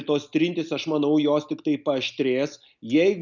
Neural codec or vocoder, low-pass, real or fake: none; 7.2 kHz; real